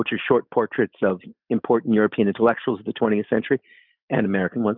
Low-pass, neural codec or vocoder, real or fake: 5.4 kHz; none; real